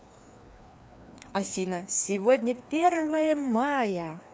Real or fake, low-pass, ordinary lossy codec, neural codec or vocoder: fake; none; none; codec, 16 kHz, 2 kbps, FreqCodec, larger model